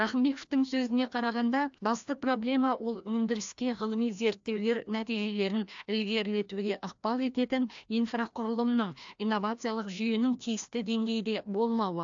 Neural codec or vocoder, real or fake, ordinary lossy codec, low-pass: codec, 16 kHz, 1 kbps, FreqCodec, larger model; fake; AAC, 64 kbps; 7.2 kHz